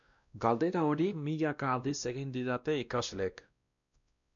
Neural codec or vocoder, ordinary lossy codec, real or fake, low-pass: codec, 16 kHz, 1 kbps, X-Codec, WavLM features, trained on Multilingual LibriSpeech; MP3, 96 kbps; fake; 7.2 kHz